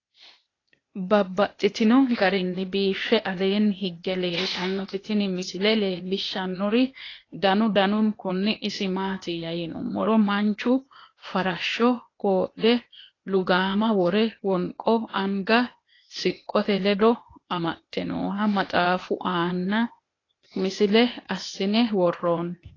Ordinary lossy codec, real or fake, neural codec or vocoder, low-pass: AAC, 32 kbps; fake; codec, 16 kHz, 0.8 kbps, ZipCodec; 7.2 kHz